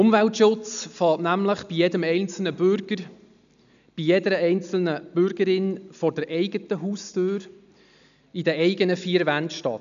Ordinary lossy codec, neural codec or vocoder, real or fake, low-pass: MP3, 96 kbps; none; real; 7.2 kHz